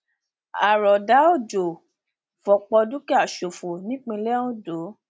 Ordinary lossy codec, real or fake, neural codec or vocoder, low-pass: none; real; none; none